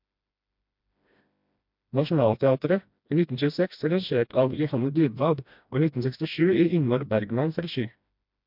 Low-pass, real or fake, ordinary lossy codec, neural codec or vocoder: 5.4 kHz; fake; MP3, 48 kbps; codec, 16 kHz, 1 kbps, FreqCodec, smaller model